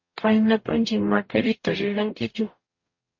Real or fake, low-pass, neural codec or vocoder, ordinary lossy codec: fake; 7.2 kHz; codec, 44.1 kHz, 0.9 kbps, DAC; MP3, 32 kbps